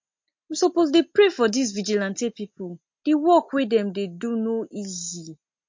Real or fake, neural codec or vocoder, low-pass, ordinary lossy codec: real; none; 7.2 kHz; MP3, 48 kbps